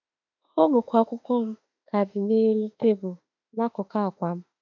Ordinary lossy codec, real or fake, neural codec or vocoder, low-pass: none; fake; autoencoder, 48 kHz, 32 numbers a frame, DAC-VAE, trained on Japanese speech; 7.2 kHz